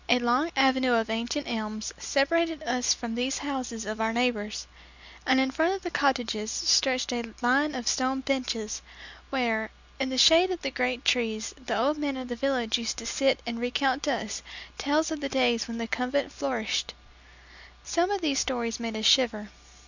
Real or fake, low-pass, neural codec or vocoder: real; 7.2 kHz; none